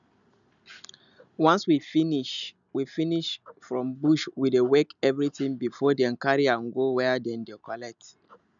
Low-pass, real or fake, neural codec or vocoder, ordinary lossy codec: 7.2 kHz; real; none; none